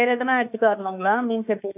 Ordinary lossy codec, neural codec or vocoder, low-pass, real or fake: none; autoencoder, 48 kHz, 32 numbers a frame, DAC-VAE, trained on Japanese speech; 3.6 kHz; fake